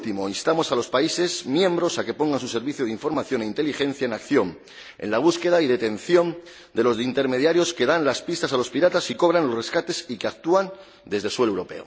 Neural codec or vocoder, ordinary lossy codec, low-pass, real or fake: none; none; none; real